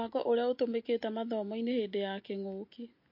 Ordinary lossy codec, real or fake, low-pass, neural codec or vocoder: MP3, 32 kbps; real; 5.4 kHz; none